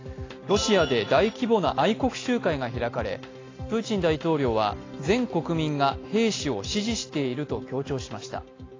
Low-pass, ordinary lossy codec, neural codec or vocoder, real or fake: 7.2 kHz; AAC, 32 kbps; none; real